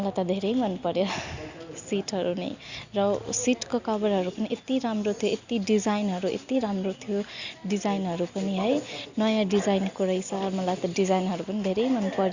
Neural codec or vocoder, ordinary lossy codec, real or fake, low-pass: none; Opus, 64 kbps; real; 7.2 kHz